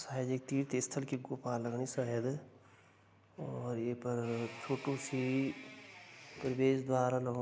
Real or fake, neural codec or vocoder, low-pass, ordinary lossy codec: real; none; none; none